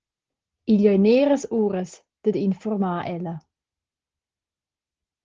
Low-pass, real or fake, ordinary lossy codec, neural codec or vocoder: 7.2 kHz; real; Opus, 16 kbps; none